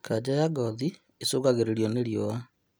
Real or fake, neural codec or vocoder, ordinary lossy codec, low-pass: real; none; none; none